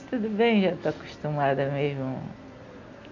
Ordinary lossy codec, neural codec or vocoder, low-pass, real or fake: none; none; 7.2 kHz; real